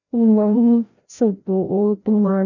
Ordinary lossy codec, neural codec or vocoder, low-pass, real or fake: none; codec, 16 kHz, 0.5 kbps, FreqCodec, larger model; 7.2 kHz; fake